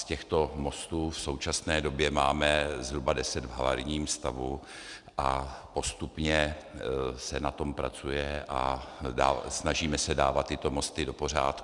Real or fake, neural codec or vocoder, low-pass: real; none; 10.8 kHz